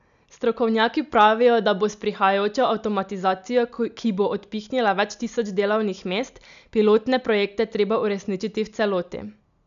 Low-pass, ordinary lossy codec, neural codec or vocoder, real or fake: 7.2 kHz; none; none; real